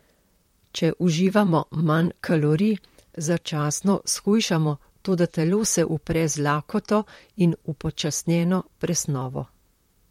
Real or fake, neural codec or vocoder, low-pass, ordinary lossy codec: fake; vocoder, 44.1 kHz, 128 mel bands, Pupu-Vocoder; 19.8 kHz; MP3, 64 kbps